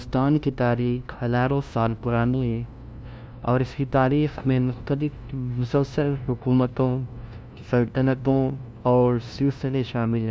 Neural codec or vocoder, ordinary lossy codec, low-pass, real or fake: codec, 16 kHz, 0.5 kbps, FunCodec, trained on LibriTTS, 25 frames a second; none; none; fake